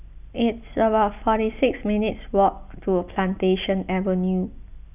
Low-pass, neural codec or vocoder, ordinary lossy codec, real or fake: 3.6 kHz; none; none; real